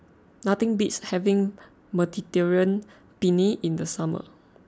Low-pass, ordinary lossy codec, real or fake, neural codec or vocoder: none; none; real; none